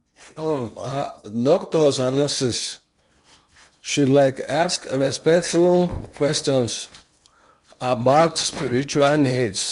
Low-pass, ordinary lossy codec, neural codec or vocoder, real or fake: 10.8 kHz; MP3, 64 kbps; codec, 16 kHz in and 24 kHz out, 0.8 kbps, FocalCodec, streaming, 65536 codes; fake